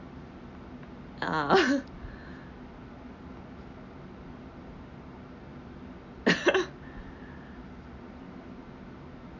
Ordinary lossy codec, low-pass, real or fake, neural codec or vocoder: none; 7.2 kHz; real; none